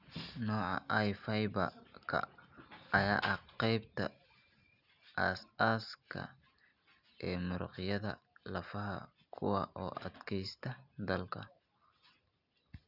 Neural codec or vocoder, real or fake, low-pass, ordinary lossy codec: none; real; 5.4 kHz; Opus, 64 kbps